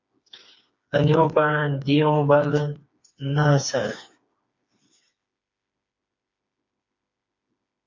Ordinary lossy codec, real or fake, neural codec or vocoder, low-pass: MP3, 48 kbps; fake; codec, 16 kHz, 4 kbps, FreqCodec, smaller model; 7.2 kHz